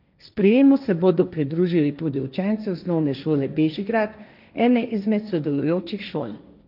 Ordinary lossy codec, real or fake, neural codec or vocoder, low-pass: none; fake; codec, 16 kHz, 1.1 kbps, Voila-Tokenizer; 5.4 kHz